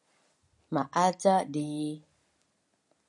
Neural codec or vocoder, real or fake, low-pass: none; real; 10.8 kHz